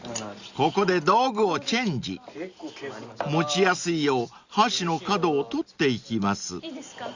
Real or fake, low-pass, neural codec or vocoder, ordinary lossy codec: real; 7.2 kHz; none; Opus, 64 kbps